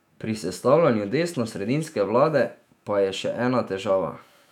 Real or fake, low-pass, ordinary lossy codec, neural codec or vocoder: fake; 19.8 kHz; none; autoencoder, 48 kHz, 128 numbers a frame, DAC-VAE, trained on Japanese speech